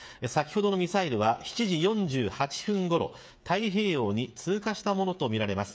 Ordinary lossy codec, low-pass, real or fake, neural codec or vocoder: none; none; fake; codec, 16 kHz, 8 kbps, FreqCodec, smaller model